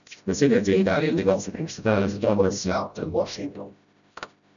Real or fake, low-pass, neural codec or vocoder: fake; 7.2 kHz; codec, 16 kHz, 0.5 kbps, FreqCodec, smaller model